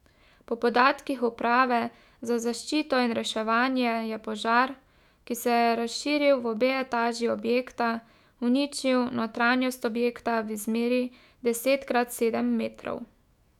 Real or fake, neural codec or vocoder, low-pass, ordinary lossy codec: fake; autoencoder, 48 kHz, 128 numbers a frame, DAC-VAE, trained on Japanese speech; 19.8 kHz; none